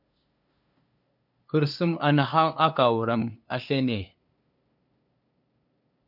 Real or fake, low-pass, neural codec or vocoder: fake; 5.4 kHz; codec, 16 kHz, 2 kbps, FunCodec, trained on LibriTTS, 25 frames a second